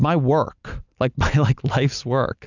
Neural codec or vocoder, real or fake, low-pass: none; real; 7.2 kHz